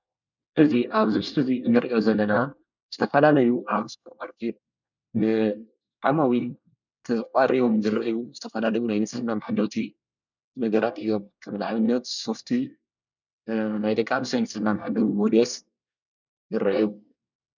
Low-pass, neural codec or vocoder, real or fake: 7.2 kHz; codec, 24 kHz, 1 kbps, SNAC; fake